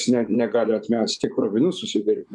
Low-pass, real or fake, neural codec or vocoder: 10.8 kHz; fake; vocoder, 24 kHz, 100 mel bands, Vocos